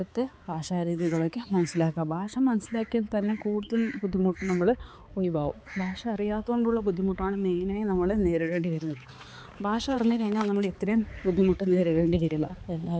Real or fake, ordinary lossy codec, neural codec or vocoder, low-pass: fake; none; codec, 16 kHz, 4 kbps, X-Codec, HuBERT features, trained on balanced general audio; none